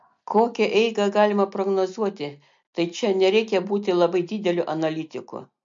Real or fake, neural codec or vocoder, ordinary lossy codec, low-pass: real; none; MP3, 48 kbps; 7.2 kHz